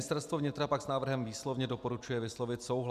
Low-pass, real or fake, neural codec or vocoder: 14.4 kHz; real; none